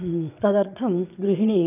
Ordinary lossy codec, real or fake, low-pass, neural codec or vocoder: none; fake; 3.6 kHz; vocoder, 22.05 kHz, 80 mel bands, Vocos